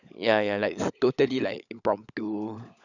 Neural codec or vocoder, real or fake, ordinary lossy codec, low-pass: codec, 16 kHz, 16 kbps, FunCodec, trained on LibriTTS, 50 frames a second; fake; none; 7.2 kHz